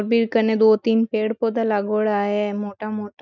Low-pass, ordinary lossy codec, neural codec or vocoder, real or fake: 7.2 kHz; none; none; real